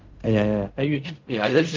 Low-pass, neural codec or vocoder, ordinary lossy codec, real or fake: 7.2 kHz; codec, 16 kHz in and 24 kHz out, 0.4 kbps, LongCat-Audio-Codec, fine tuned four codebook decoder; Opus, 24 kbps; fake